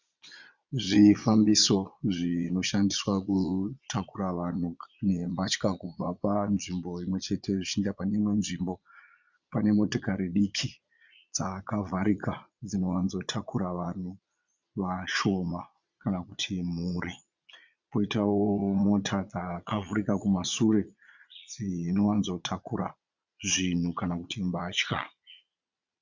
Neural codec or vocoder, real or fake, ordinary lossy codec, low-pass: vocoder, 22.05 kHz, 80 mel bands, Vocos; fake; Opus, 64 kbps; 7.2 kHz